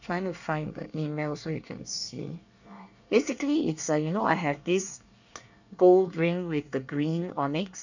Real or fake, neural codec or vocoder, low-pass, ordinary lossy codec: fake; codec, 24 kHz, 1 kbps, SNAC; 7.2 kHz; none